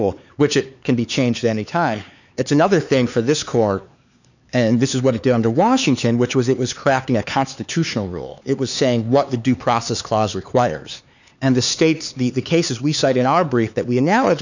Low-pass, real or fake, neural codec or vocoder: 7.2 kHz; fake; codec, 16 kHz, 4 kbps, X-Codec, HuBERT features, trained on LibriSpeech